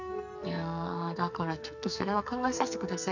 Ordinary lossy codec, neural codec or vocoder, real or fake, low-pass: none; codec, 44.1 kHz, 2.6 kbps, SNAC; fake; 7.2 kHz